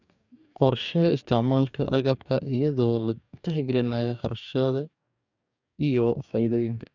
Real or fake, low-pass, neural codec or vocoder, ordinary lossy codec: fake; 7.2 kHz; codec, 44.1 kHz, 2.6 kbps, DAC; none